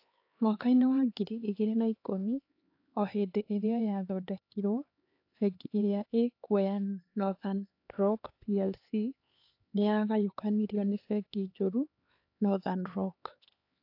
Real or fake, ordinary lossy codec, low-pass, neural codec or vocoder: fake; AAC, 32 kbps; 5.4 kHz; codec, 16 kHz, 4 kbps, X-Codec, HuBERT features, trained on LibriSpeech